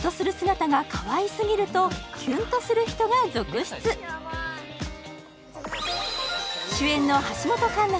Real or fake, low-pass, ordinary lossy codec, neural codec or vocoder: real; none; none; none